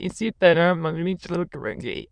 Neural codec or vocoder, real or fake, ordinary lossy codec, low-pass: autoencoder, 22.05 kHz, a latent of 192 numbers a frame, VITS, trained on many speakers; fake; Opus, 64 kbps; 9.9 kHz